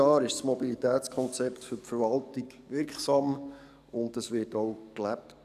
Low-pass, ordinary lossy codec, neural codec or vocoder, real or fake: 14.4 kHz; none; codec, 44.1 kHz, 7.8 kbps, DAC; fake